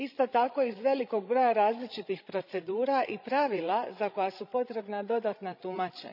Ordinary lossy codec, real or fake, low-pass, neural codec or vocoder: none; fake; 5.4 kHz; codec, 16 kHz, 16 kbps, FreqCodec, larger model